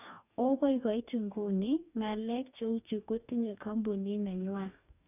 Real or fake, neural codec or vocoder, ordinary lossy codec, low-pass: fake; codec, 44.1 kHz, 2.6 kbps, DAC; none; 3.6 kHz